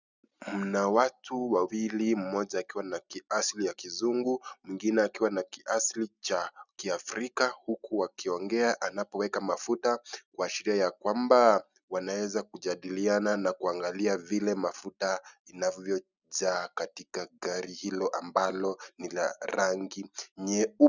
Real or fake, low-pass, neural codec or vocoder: real; 7.2 kHz; none